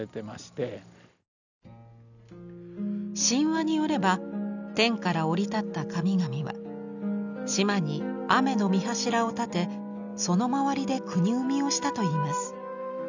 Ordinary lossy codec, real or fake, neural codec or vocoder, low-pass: none; real; none; 7.2 kHz